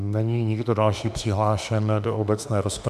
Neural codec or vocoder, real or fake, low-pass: autoencoder, 48 kHz, 32 numbers a frame, DAC-VAE, trained on Japanese speech; fake; 14.4 kHz